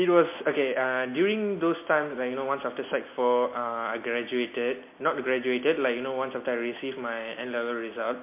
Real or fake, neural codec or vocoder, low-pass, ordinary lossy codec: real; none; 3.6 kHz; MP3, 24 kbps